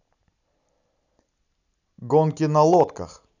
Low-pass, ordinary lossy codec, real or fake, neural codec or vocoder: 7.2 kHz; none; real; none